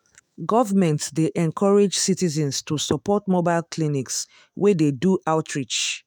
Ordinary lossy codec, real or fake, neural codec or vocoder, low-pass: none; fake; autoencoder, 48 kHz, 128 numbers a frame, DAC-VAE, trained on Japanese speech; none